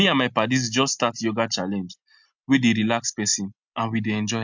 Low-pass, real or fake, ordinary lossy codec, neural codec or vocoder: 7.2 kHz; real; MP3, 64 kbps; none